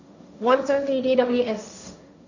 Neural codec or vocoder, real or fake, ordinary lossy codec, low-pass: codec, 16 kHz, 1.1 kbps, Voila-Tokenizer; fake; none; 7.2 kHz